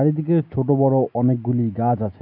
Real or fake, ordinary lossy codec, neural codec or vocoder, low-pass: real; none; none; 5.4 kHz